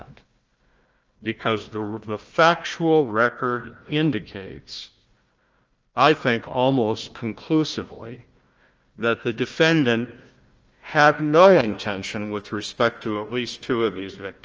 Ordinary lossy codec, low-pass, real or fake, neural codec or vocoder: Opus, 24 kbps; 7.2 kHz; fake; codec, 16 kHz, 1 kbps, FunCodec, trained on Chinese and English, 50 frames a second